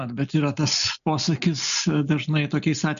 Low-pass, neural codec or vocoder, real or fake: 7.2 kHz; none; real